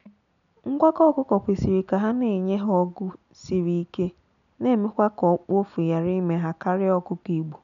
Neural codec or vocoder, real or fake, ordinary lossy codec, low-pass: none; real; none; 7.2 kHz